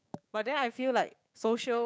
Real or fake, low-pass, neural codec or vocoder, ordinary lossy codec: fake; none; codec, 16 kHz, 6 kbps, DAC; none